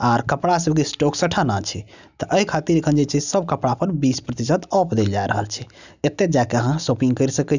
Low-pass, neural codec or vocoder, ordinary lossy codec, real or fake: 7.2 kHz; none; none; real